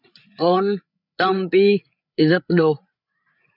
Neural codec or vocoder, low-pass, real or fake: codec, 16 kHz, 8 kbps, FreqCodec, larger model; 5.4 kHz; fake